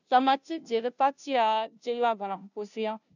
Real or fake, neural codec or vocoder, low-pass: fake; codec, 16 kHz, 0.5 kbps, FunCodec, trained on Chinese and English, 25 frames a second; 7.2 kHz